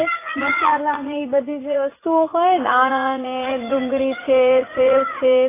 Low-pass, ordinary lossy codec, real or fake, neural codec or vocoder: 3.6 kHz; AAC, 16 kbps; fake; vocoder, 44.1 kHz, 80 mel bands, Vocos